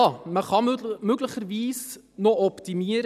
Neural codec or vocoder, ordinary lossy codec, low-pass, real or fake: none; none; 14.4 kHz; real